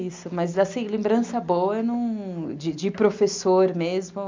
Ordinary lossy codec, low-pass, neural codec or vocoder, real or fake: MP3, 64 kbps; 7.2 kHz; none; real